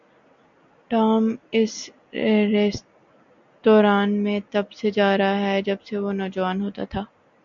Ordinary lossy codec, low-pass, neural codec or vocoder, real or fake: MP3, 64 kbps; 7.2 kHz; none; real